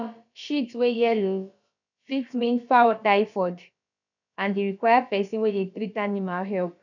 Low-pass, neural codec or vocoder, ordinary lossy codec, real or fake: 7.2 kHz; codec, 16 kHz, about 1 kbps, DyCAST, with the encoder's durations; none; fake